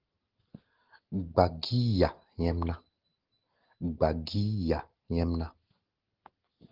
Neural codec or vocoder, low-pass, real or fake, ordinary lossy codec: none; 5.4 kHz; real; Opus, 16 kbps